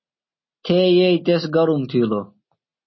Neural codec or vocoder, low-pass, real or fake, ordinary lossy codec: none; 7.2 kHz; real; MP3, 24 kbps